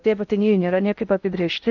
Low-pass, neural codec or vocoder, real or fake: 7.2 kHz; codec, 16 kHz in and 24 kHz out, 0.6 kbps, FocalCodec, streaming, 2048 codes; fake